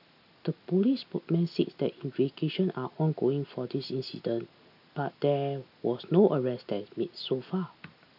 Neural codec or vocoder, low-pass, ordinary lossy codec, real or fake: none; 5.4 kHz; AAC, 48 kbps; real